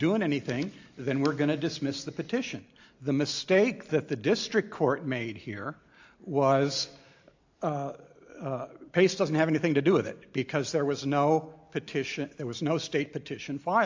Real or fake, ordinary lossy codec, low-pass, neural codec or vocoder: real; AAC, 48 kbps; 7.2 kHz; none